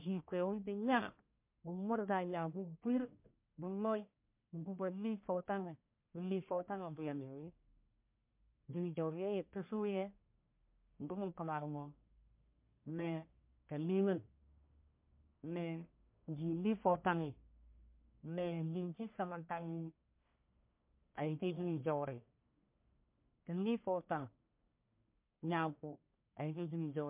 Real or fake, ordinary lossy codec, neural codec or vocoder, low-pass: fake; MP3, 32 kbps; codec, 44.1 kHz, 1.7 kbps, Pupu-Codec; 3.6 kHz